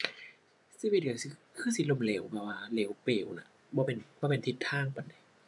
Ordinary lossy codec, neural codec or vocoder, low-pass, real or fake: none; vocoder, 24 kHz, 100 mel bands, Vocos; 10.8 kHz; fake